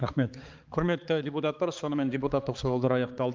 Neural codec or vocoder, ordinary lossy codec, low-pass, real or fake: codec, 16 kHz, 4 kbps, X-Codec, HuBERT features, trained on balanced general audio; Opus, 32 kbps; 7.2 kHz; fake